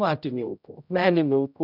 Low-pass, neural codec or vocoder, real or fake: 5.4 kHz; codec, 16 kHz, 0.5 kbps, X-Codec, HuBERT features, trained on general audio; fake